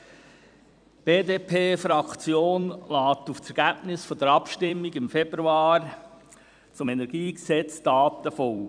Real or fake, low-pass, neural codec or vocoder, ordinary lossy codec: fake; 9.9 kHz; vocoder, 44.1 kHz, 128 mel bands every 256 samples, BigVGAN v2; none